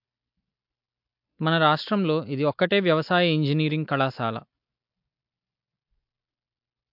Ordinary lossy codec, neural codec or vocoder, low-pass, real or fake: MP3, 48 kbps; none; 5.4 kHz; real